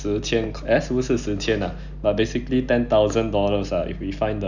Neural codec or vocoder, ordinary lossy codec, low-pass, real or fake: none; none; 7.2 kHz; real